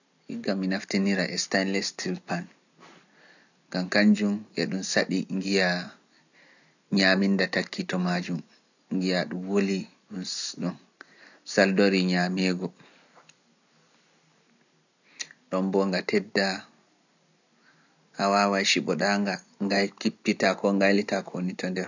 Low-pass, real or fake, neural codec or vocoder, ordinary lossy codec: 7.2 kHz; real; none; none